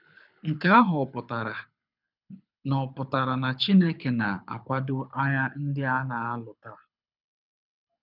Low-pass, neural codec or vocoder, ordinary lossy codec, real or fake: 5.4 kHz; codec, 24 kHz, 6 kbps, HILCodec; none; fake